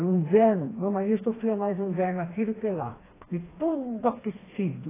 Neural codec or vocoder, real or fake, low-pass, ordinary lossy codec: codec, 16 kHz, 2 kbps, FreqCodec, smaller model; fake; 3.6 kHz; AAC, 16 kbps